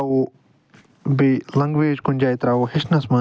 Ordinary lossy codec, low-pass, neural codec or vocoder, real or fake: none; none; none; real